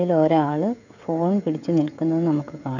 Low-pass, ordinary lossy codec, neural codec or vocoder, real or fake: 7.2 kHz; none; codec, 16 kHz, 16 kbps, FreqCodec, smaller model; fake